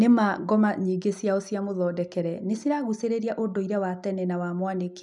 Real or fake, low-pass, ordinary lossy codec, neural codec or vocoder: real; 10.8 kHz; none; none